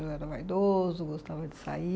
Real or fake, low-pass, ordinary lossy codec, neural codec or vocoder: real; none; none; none